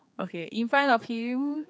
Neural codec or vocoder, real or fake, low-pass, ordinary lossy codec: codec, 16 kHz, 4 kbps, X-Codec, HuBERT features, trained on general audio; fake; none; none